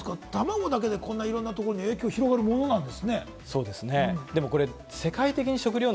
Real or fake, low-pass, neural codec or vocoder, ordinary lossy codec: real; none; none; none